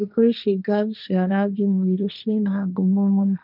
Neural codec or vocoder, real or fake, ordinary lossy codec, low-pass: codec, 16 kHz, 1.1 kbps, Voila-Tokenizer; fake; none; 5.4 kHz